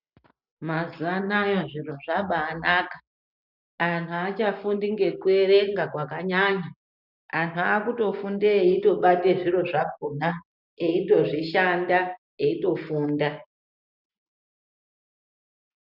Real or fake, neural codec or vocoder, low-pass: real; none; 5.4 kHz